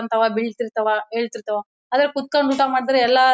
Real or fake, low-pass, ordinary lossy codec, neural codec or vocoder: real; none; none; none